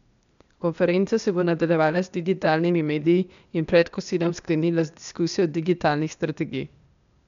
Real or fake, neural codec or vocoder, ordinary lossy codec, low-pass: fake; codec, 16 kHz, 0.8 kbps, ZipCodec; MP3, 96 kbps; 7.2 kHz